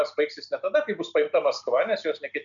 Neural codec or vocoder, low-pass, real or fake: none; 7.2 kHz; real